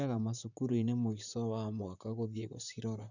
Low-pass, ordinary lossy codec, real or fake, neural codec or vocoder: 7.2 kHz; none; fake; vocoder, 44.1 kHz, 128 mel bands, Pupu-Vocoder